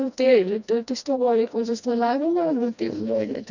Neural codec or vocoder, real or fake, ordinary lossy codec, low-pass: codec, 16 kHz, 1 kbps, FreqCodec, smaller model; fake; none; 7.2 kHz